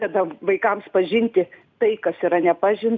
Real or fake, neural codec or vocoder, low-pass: real; none; 7.2 kHz